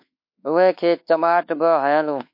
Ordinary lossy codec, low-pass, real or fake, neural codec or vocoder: MP3, 32 kbps; 5.4 kHz; fake; codec, 24 kHz, 1.2 kbps, DualCodec